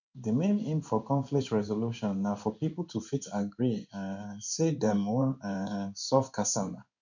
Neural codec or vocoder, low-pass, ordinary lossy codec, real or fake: codec, 16 kHz in and 24 kHz out, 1 kbps, XY-Tokenizer; 7.2 kHz; none; fake